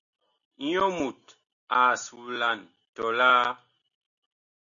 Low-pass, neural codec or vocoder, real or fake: 7.2 kHz; none; real